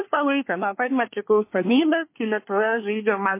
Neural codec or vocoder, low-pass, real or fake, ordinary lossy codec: codec, 24 kHz, 1 kbps, SNAC; 3.6 kHz; fake; MP3, 24 kbps